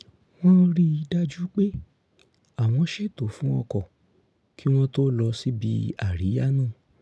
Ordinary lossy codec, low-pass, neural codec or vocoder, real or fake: none; none; none; real